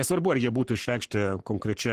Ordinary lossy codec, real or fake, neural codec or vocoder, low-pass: Opus, 16 kbps; fake; codec, 44.1 kHz, 7.8 kbps, Pupu-Codec; 14.4 kHz